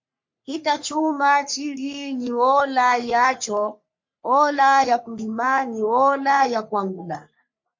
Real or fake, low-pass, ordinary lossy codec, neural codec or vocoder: fake; 7.2 kHz; MP3, 48 kbps; codec, 44.1 kHz, 3.4 kbps, Pupu-Codec